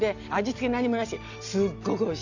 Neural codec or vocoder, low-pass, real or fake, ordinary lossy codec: none; 7.2 kHz; real; none